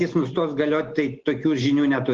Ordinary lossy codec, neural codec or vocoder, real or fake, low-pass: Opus, 16 kbps; none; real; 7.2 kHz